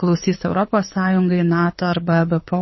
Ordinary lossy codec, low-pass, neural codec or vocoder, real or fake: MP3, 24 kbps; 7.2 kHz; codec, 24 kHz, 6 kbps, HILCodec; fake